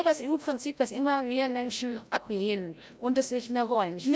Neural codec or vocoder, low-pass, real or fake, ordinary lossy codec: codec, 16 kHz, 0.5 kbps, FreqCodec, larger model; none; fake; none